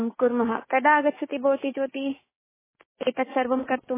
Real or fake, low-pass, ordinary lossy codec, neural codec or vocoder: fake; 3.6 kHz; MP3, 16 kbps; codec, 16 kHz, 6 kbps, DAC